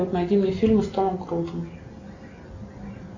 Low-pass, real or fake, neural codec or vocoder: 7.2 kHz; real; none